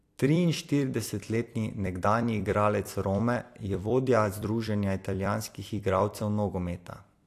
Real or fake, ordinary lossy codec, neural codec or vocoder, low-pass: fake; AAC, 64 kbps; vocoder, 44.1 kHz, 128 mel bands every 256 samples, BigVGAN v2; 14.4 kHz